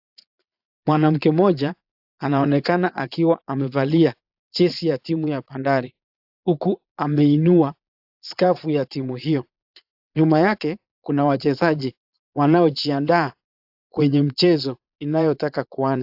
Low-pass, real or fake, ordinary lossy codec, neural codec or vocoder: 5.4 kHz; fake; AAC, 48 kbps; vocoder, 22.05 kHz, 80 mel bands, Vocos